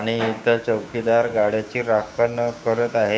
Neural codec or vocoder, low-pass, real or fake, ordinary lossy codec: codec, 16 kHz, 6 kbps, DAC; none; fake; none